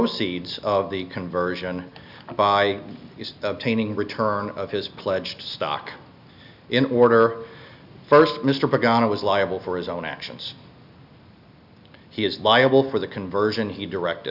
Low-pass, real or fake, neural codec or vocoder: 5.4 kHz; real; none